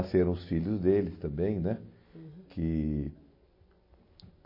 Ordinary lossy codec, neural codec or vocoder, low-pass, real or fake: MP3, 24 kbps; none; 5.4 kHz; real